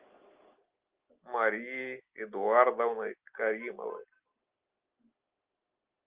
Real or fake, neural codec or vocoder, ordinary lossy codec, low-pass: real; none; Opus, 32 kbps; 3.6 kHz